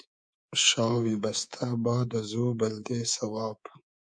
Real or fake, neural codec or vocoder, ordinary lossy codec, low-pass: fake; codec, 24 kHz, 3.1 kbps, DualCodec; MP3, 96 kbps; 9.9 kHz